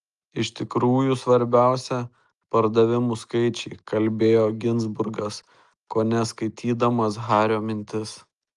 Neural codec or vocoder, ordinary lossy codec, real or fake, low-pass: none; Opus, 32 kbps; real; 10.8 kHz